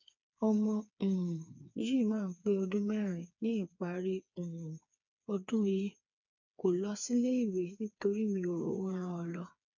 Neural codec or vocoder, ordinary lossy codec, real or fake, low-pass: codec, 16 kHz, 4 kbps, FreqCodec, smaller model; none; fake; 7.2 kHz